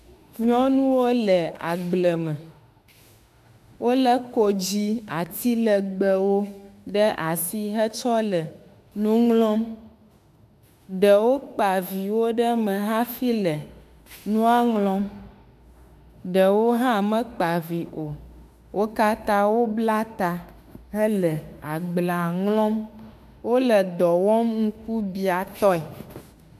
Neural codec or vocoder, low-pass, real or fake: autoencoder, 48 kHz, 32 numbers a frame, DAC-VAE, trained on Japanese speech; 14.4 kHz; fake